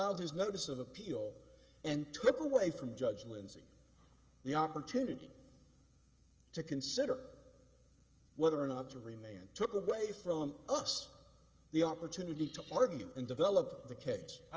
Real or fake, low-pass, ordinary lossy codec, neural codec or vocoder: fake; 7.2 kHz; Opus, 24 kbps; codec, 16 kHz, 16 kbps, FreqCodec, smaller model